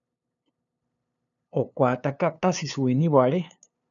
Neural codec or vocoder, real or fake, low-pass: codec, 16 kHz, 2 kbps, FunCodec, trained on LibriTTS, 25 frames a second; fake; 7.2 kHz